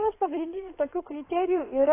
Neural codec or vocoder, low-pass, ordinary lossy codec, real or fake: codec, 16 kHz in and 24 kHz out, 2.2 kbps, FireRedTTS-2 codec; 3.6 kHz; AAC, 24 kbps; fake